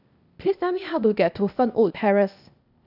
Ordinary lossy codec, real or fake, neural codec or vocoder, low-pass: none; fake; codec, 16 kHz, 0.8 kbps, ZipCodec; 5.4 kHz